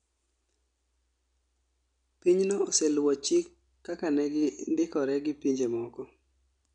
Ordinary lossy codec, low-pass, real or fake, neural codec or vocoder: none; 9.9 kHz; real; none